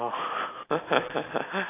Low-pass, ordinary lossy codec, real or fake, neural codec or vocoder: 3.6 kHz; AAC, 24 kbps; real; none